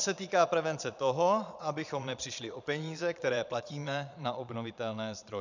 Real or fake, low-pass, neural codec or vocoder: fake; 7.2 kHz; vocoder, 22.05 kHz, 80 mel bands, Vocos